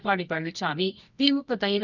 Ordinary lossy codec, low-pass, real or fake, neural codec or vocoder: none; 7.2 kHz; fake; codec, 24 kHz, 0.9 kbps, WavTokenizer, medium music audio release